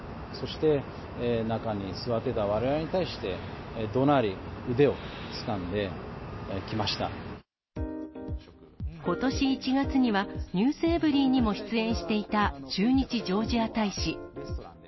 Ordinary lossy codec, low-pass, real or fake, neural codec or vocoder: MP3, 24 kbps; 7.2 kHz; real; none